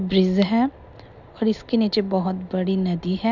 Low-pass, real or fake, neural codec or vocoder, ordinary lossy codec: 7.2 kHz; real; none; none